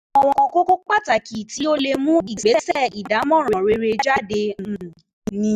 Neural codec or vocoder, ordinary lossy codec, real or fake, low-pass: none; none; real; 14.4 kHz